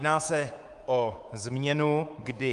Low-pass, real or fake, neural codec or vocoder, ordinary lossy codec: 10.8 kHz; fake; codec, 24 kHz, 3.1 kbps, DualCodec; Opus, 32 kbps